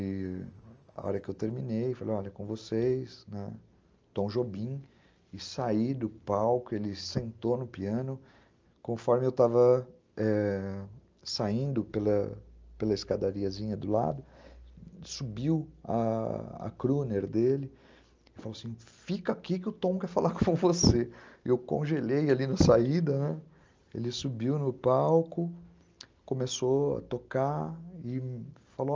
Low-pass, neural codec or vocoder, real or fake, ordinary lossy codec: 7.2 kHz; none; real; Opus, 24 kbps